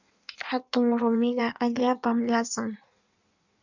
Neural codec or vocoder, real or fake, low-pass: codec, 16 kHz in and 24 kHz out, 1.1 kbps, FireRedTTS-2 codec; fake; 7.2 kHz